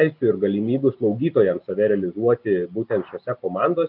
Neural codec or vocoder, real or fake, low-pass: none; real; 5.4 kHz